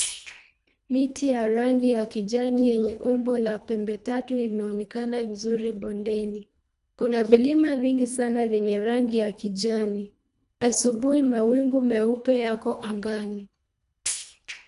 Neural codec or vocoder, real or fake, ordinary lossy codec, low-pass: codec, 24 kHz, 1.5 kbps, HILCodec; fake; none; 10.8 kHz